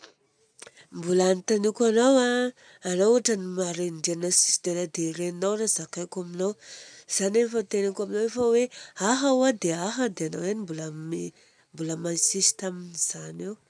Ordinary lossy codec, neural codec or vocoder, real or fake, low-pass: none; none; real; 9.9 kHz